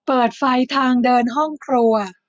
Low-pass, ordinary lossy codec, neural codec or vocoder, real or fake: none; none; none; real